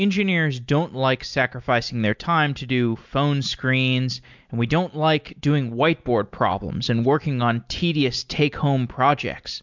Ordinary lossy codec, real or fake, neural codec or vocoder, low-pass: MP3, 64 kbps; real; none; 7.2 kHz